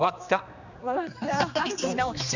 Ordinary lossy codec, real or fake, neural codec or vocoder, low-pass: none; fake; codec, 16 kHz, 2 kbps, X-Codec, HuBERT features, trained on general audio; 7.2 kHz